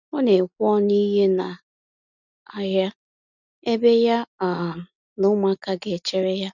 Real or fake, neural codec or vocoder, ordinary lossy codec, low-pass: real; none; none; 7.2 kHz